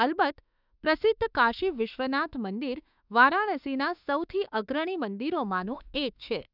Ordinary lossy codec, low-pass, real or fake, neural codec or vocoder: none; 5.4 kHz; fake; autoencoder, 48 kHz, 32 numbers a frame, DAC-VAE, trained on Japanese speech